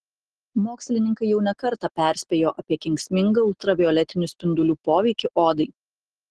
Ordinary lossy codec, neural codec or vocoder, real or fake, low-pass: Opus, 16 kbps; none; real; 10.8 kHz